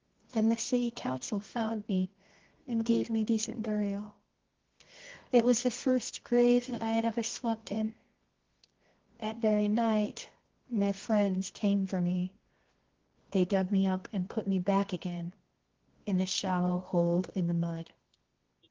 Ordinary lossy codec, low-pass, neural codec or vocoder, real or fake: Opus, 16 kbps; 7.2 kHz; codec, 24 kHz, 0.9 kbps, WavTokenizer, medium music audio release; fake